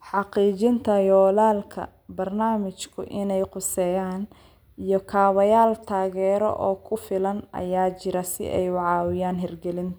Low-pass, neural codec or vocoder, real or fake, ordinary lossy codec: none; none; real; none